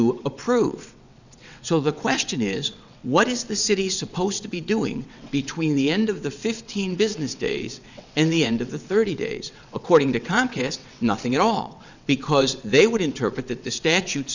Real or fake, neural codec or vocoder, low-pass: fake; vocoder, 22.05 kHz, 80 mel bands, WaveNeXt; 7.2 kHz